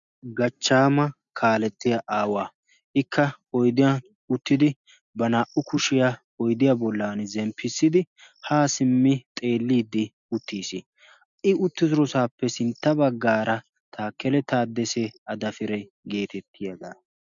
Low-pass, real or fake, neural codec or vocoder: 7.2 kHz; real; none